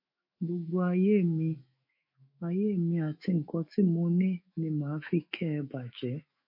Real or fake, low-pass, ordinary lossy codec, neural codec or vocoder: fake; 5.4 kHz; MP3, 32 kbps; autoencoder, 48 kHz, 128 numbers a frame, DAC-VAE, trained on Japanese speech